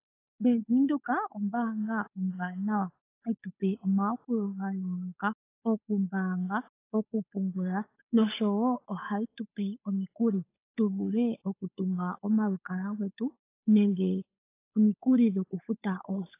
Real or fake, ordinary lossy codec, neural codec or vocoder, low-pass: fake; AAC, 24 kbps; codec, 16 kHz, 8 kbps, FunCodec, trained on Chinese and English, 25 frames a second; 3.6 kHz